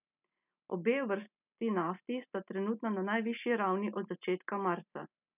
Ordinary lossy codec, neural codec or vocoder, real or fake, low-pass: none; none; real; 3.6 kHz